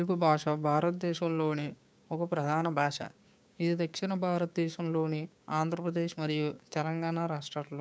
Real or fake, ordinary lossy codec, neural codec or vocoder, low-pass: fake; none; codec, 16 kHz, 6 kbps, DAC; none